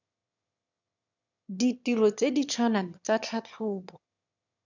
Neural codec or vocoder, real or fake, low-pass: autoencoder, 22.05 kHz, a latent of 192 numbers a frame, VITS, trained on one speaker; fake; 7.2 kHz